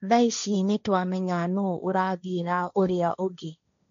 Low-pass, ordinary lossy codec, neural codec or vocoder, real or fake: 7.2 kHz; none; codec, 16 kHz, 1.1 kbps, Voila-Tokenizer; fake